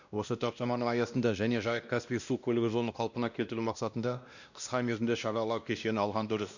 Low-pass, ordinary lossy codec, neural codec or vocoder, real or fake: 7.2 kHz; none; codec, 16 kHz, 1 kbps, X-Codec, WavLM features, trained on Multilingual LibriSpeech; fake